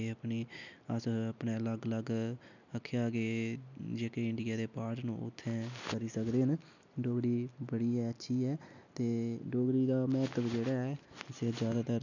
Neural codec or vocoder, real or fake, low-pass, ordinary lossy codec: none; real; 7.2 kHz; none